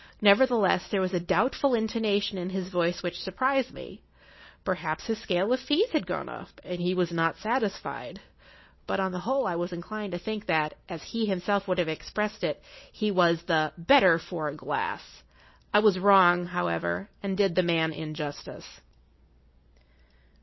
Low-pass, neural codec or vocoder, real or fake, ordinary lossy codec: 7.2 kHz; none; real; MP3, 24 kbps